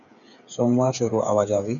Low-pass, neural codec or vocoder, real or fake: 7.2 kHz; codec, 16 kHz, 8 kbps, FreqCodec, smaller model; fake